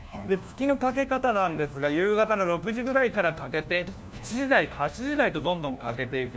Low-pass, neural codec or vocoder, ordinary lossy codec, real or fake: none; codec, 16 kHz, 1 kbps, FunCodec, trained on LibriTTS, 50 frames a second; none; fake